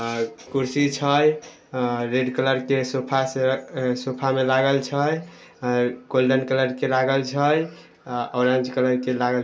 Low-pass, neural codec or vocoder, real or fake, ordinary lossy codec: none; none; real; none